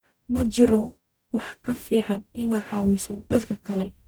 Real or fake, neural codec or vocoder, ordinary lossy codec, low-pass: fake; codec, 44.1 kHz, 0.9 kbps, DAC; none; none